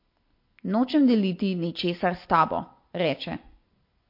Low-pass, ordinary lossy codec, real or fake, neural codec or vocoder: 5.4 kHz; MP3, 32 kbps; real; none